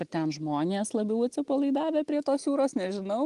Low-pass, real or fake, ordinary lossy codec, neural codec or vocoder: 10.8 kHz; real; Opus, 24 kbps; none